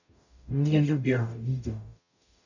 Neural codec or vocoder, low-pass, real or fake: codec, 44.1 kHz, 0.9 kbps, DAC; 7.2 kHz; fake